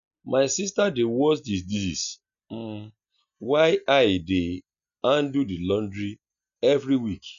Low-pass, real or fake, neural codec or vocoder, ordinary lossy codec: 7.2 kHz; real; none; none